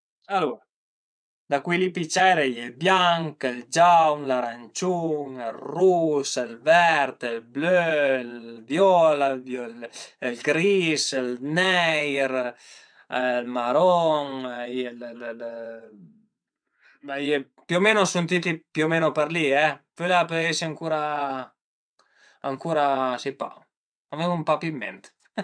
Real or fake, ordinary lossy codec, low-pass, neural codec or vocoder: fake; MP3, 96 kbps; 9.9 kHz; vocoder, 22.05 kHz, 80 mel bands, WaveNeXt